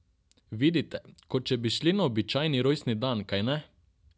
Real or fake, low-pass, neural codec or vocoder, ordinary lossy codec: real; none; none; none